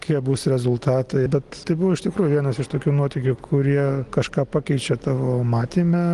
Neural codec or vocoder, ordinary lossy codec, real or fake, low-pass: none; Opus, 24 kbps; real; 10.8 kHz